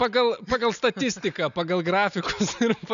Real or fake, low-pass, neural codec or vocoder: real; 7.2 kHz; none